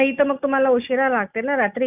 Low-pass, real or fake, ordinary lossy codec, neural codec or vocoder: 3.6 kHz; real; none; none